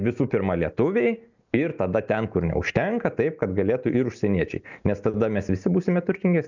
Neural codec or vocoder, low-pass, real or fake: none; 7.2 kHz; real